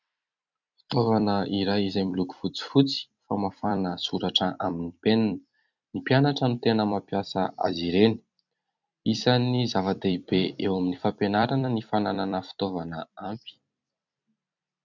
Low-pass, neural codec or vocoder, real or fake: 7.2 kHz; vocoder, 44.1 kHz, 128 mel bands every 256 samples, BigVGAN v2; fake